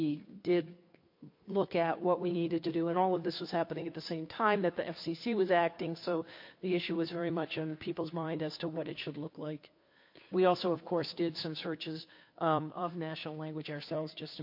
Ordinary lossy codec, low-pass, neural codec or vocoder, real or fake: MP3, 32 kbps; 5.4 kHz; codec, 16 kHz, 2 kbps, FunCodec, trained on Chinese and English, 25 frames a second; fake